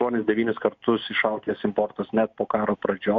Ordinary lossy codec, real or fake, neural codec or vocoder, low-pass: MP3, 64 kbps; real; none; 7.2 kHz